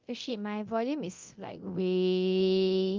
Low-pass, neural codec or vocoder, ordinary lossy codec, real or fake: 7.2 kHz; codec, 24 kHz, 0.9 kbps, DualCodec; Opus, 32 kbps; fake